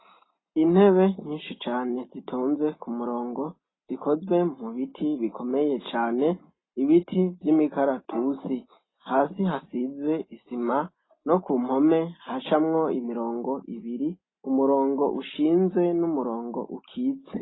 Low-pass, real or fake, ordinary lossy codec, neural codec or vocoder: 7.2 kHz; real; AAC, 16 kbps; none